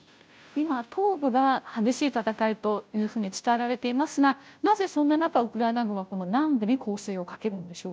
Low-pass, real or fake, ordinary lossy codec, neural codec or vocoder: none; fake; none; codec, 16 kHz, 0.5 kbps, FunCodec, trained on Chinese and English, 25 frames a second